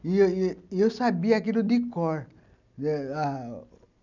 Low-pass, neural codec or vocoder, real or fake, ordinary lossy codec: 7.2 kHz; none; real; none